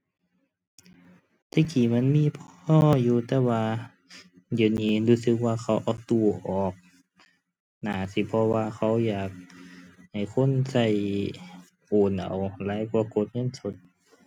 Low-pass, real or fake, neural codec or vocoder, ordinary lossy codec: 19.8 kHz; real; none; none